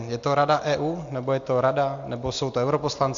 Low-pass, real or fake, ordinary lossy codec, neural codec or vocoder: 7.2 kHz; real; AAC, 64 kbps; none